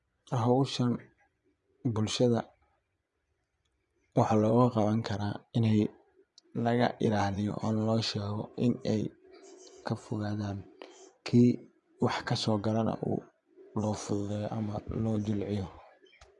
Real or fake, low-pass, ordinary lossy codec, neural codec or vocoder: fake; 10.8 kHz; none; vocoder, 24 kHz, 100 mel bands, Vocos